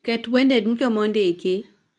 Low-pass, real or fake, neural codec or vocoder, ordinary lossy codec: 10.8 kHz; fake; codec, 24 kHz, 0.9 kbps, WavTokenizer, medium speech release version 2; none